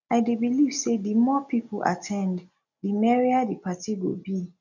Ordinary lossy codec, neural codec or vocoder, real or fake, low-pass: none; none; real; 7.2 kHz